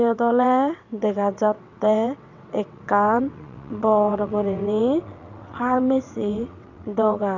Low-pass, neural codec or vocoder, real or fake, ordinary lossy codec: 7.2 kHz; vocoder, 22.05 kHz, 80 mel bands, WaveNeXt; fake; none